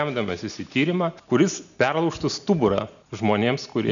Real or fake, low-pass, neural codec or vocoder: real; 7.2 kHz; none